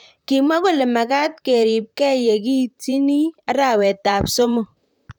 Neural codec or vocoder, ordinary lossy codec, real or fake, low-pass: vocoder, 44.1 kHz, 128 mel bands, Pupu-Vocoder; none; fake; 19.8 kHz